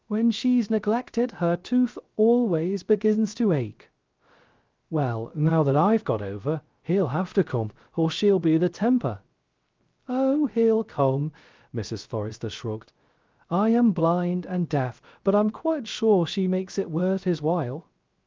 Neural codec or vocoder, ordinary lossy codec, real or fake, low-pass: codec, 16 kHz, 0.3 kbps, FocalCodec; Opus, 32 kbps; fake; 7.2 kHz